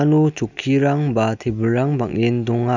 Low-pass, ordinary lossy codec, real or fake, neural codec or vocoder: 7.2 kHz; none; real; none